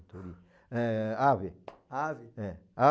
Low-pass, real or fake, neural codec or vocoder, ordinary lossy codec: none; real; none; none